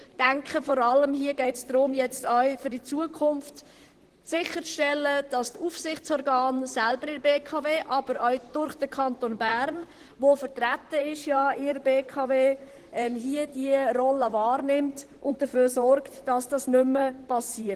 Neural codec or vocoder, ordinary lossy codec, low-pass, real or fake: vocoder, 44.1 kHz, 128 mel bands, Pupu-Vocoder; Opus, 16 kbps; 14.4 kHz; fake